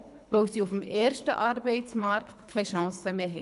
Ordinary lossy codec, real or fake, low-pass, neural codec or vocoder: none; fake; 10.8 kHz; codec, 24 kHz, 3 kbps, HILCodec